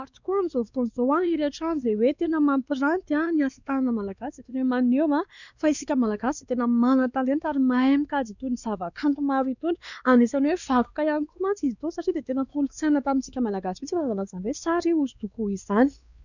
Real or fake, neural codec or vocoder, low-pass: fake; codec, 16 kHz, 2 kbps, X-Codec, WavLM features, trained on Multilingual LibriSpeech; 7.2 kHz